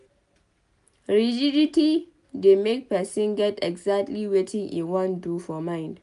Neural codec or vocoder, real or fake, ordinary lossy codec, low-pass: none; real; none; 10.8 kHz